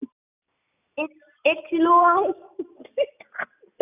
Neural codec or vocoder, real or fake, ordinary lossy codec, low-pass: none; real; none; 3.6 kHz